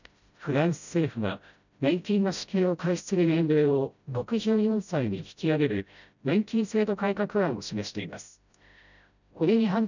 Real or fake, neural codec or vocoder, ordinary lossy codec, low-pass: fake; codec, 16 kHz, 0.5 kbps, FreqCodec, smaller model; none; 7.2 kHz